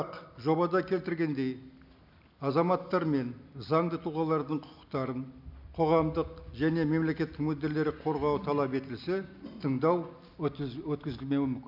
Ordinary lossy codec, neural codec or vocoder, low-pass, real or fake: none; none; 5.4 kHz; real